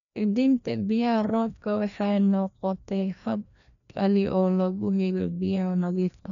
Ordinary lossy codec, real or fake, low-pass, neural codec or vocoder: none; fake; 7.2 kHz; codec, 16 kHz, 1 kbps, FreqCodec, larger model